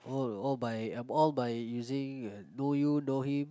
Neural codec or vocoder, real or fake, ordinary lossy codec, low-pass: none; real; none; none